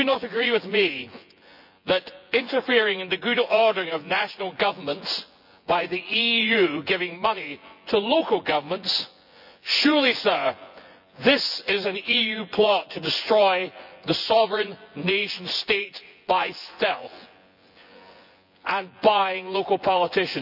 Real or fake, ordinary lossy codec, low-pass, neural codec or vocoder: fake; none; 5.4 kHz; vocoder, 24 kHz, 100 mel bands, Vocos